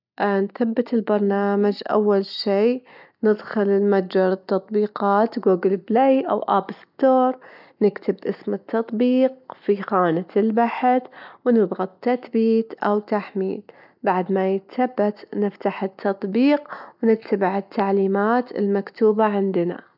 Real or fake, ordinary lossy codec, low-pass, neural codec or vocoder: real; none; 5.4 kHz; none